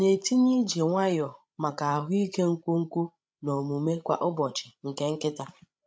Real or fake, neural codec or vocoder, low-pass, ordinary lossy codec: fake; codec, 16 kHz, 16 kbps, FreqCodec, larger model; none; none